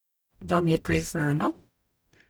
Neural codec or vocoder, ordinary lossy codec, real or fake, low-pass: codec, 44.1 kHz, 0.9 kbps, DAC; none; fake; none